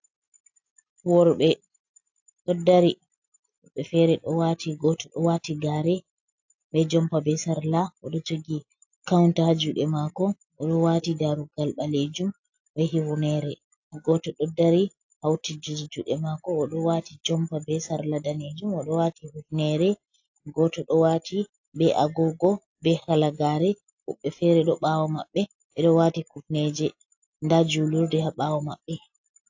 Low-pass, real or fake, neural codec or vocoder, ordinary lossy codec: 7.2 kHz; real; none; AAC, 48 kbps